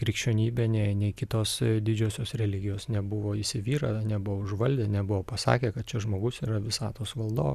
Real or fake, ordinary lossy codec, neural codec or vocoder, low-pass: real; Opus, 64 kbps; none; 14.4 kHz